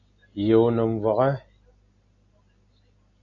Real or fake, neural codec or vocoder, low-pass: real; none; 7.2 kHz